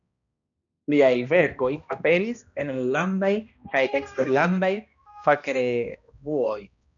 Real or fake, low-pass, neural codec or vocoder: fake; 7.2 kHz; codec, 16 kHz, 1 kbps, X-Codec, HuBERT features, trained on balanced general audio